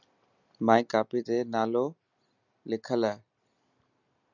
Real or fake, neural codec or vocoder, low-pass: real; none; 7.2 kHz